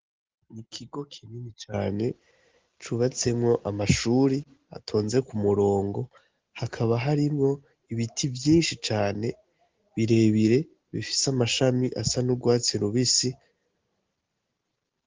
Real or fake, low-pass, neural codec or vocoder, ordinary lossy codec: real; 7.2 kHz; none; Opus, 16 kbps